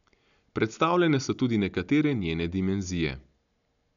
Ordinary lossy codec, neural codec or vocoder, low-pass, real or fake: none; none; 7.2 kHz; real